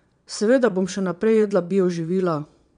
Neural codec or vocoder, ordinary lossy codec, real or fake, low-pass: vocoder, 22.05 kHz, 80 mel bands, Vocos; none; fake; 9.9 kHz